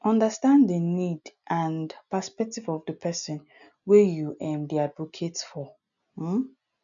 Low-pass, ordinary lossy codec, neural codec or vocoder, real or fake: 7.2 kHz; none; none; real